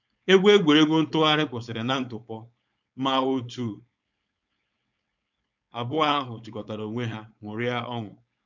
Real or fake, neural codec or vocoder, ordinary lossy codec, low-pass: fake; codec, 16 kHz, 4.8 kbps, FACodec; none; 7.2 kHz